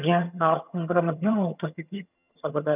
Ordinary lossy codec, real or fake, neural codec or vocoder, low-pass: none; fake; vocoder, 22.05 kHz, 80 mel bands, HiFi-GAN; 3.6 kHz